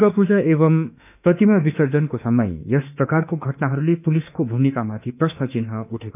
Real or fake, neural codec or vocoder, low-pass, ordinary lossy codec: fake; autoencoder, 48 kHz, 32 numbers a frame, DAC-VAE, trained on Japanese speech; 3.6 kHz; none